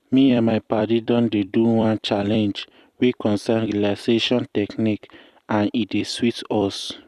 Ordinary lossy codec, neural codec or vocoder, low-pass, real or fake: none; vocoder, 44.1 kHz, 128 mel bands every 256 samples, BigVGAN v2; 14.4 kHz; fake